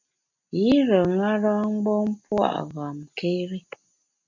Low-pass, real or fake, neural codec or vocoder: 7.2 kHz; real; none